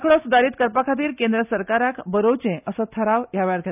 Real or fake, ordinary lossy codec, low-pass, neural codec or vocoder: real; none; 3.6 kHz; none